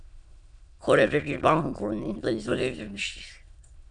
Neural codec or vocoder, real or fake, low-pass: autoencoder, 22.05 kHz, a latent of 192 numbers a frame, VITS, trained on many speakers; fake; 9.9 kHz